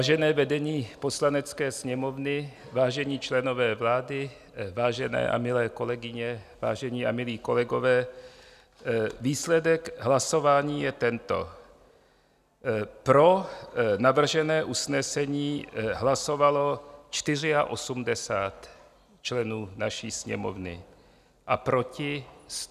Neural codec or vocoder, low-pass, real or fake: none; 14.4 kHz; real